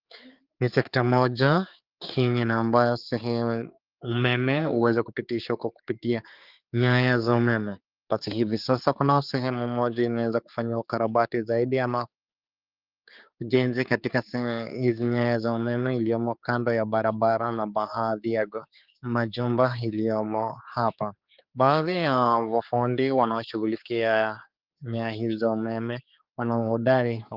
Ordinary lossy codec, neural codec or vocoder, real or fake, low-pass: Opus, 24 kbps; codec, 16 kHz, 4 kbps, X-Codec, HuBERT features, trained on general audio; fake; 5.4 kHz